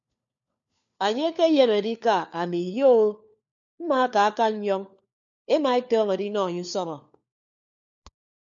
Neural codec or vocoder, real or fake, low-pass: codec, 16 kHz, 4 kbps, FunCodec, trained on LibriTTS, 50 frames a second; fake; 7.2 kHz